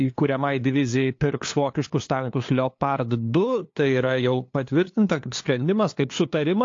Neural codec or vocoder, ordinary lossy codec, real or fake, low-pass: codec, 16 kHz, 2 kbps, FunCodec, trained on LibriTTS, 25 frames a second; AAC, 48 kbps; fake; 7.2 kHz